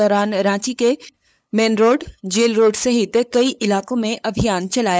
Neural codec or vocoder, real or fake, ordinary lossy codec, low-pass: codec, 16 kHz, 8 kbps, FunCodec, trained on LibriTTS, 25 frames a second; fake; none; none